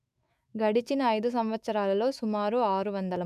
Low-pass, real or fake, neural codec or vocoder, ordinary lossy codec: 14.4 kHz; fake; autoencoder, 48 kHz, 128 numbers a frame, DAC-VAE, trained on Japanese speech; none